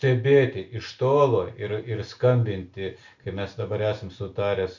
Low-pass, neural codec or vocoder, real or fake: 7.2 kHz; none; real